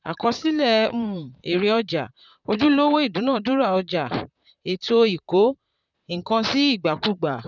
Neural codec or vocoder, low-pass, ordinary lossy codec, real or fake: vocoder, 44.1 kHz, 128 mel bands, Pupu-Vocoder; 7.2 kHz; none; fake